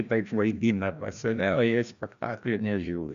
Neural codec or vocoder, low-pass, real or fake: codec, 16 kHz, 1 kbps, FreqCodec, larger model; 7.2 kHz; fake